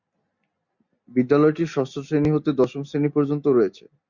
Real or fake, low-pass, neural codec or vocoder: real; 7.2 kHz; none